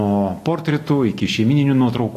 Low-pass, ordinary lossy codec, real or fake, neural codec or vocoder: 14.4 kHz; AAC, 48 kbps; real; none